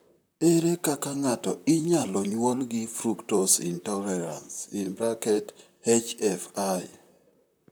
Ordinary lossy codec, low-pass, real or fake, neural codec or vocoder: none; none; fake; vocoder, 44.1 kHz, 128 mel bands, Pupu-Vocoder